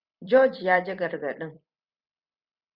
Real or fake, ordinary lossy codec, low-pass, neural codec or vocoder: fake; Opus, 64 kbps; 5.4 kHz; vocoder, 44.1 kHz, 128 mel bands every 256 samples, BigVGAN v2